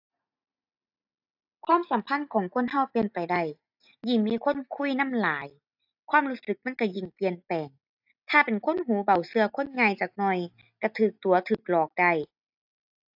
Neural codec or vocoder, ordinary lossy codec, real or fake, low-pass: none; AAC, 48 kbps; real; 5.4 kHz